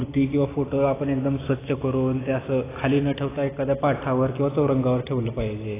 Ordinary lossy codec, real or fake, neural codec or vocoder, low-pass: AAC, 16 kbps; real; none; 3.6 kHz